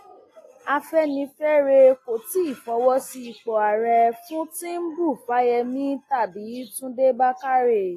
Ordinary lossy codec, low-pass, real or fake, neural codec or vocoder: AAC, 48 kbps; 14.4 kHz; real; none